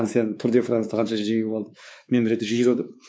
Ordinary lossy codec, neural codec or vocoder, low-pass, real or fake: none; codec, 16 kHz, 4 kbps, X-Codec, WavLM features, trained on Multilingual LibriSpeech; none; fake